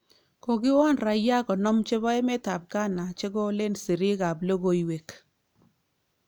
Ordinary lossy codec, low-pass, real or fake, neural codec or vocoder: none; none; real; none